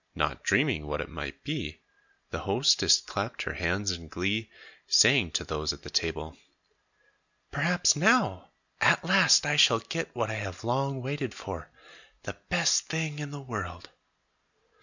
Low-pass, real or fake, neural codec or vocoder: 7.2 kHz; real; none